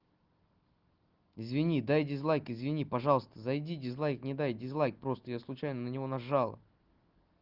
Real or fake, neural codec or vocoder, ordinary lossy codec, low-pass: real; none; Opus, 16 kbps; 5.4 kHz